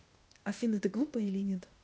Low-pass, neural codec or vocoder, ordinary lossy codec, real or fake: none; codec, 16 kHz, 0.8 kbps, ZipCodec; none; fake